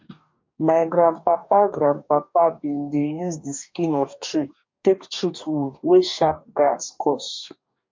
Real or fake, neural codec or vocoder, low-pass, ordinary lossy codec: fake; codec, 44.1 kHz, 2.6 kbps, DAC; 7.2 kHz; MP3, 48 kbps